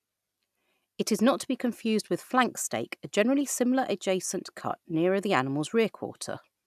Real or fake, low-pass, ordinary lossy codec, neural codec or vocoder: real; 14.4 kHz; none; none